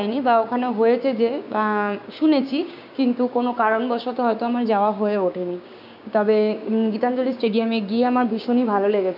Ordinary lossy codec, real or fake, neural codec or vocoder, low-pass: none; fake; codec, 16 kHz, 6 kbps, DAC; 5.4 kHz